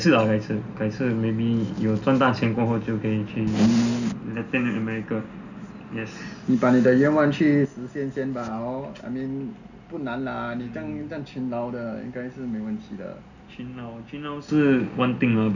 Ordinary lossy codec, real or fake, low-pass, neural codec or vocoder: none; real; 7.2 kHz; none